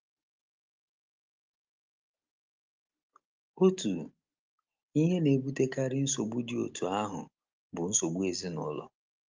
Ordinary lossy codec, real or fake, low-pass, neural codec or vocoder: Opus, 24 kbps; real; 7.2 kHz; none